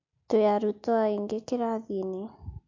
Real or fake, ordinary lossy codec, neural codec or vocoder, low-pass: real; MP3, 48 kbps; none; 7.2 kHz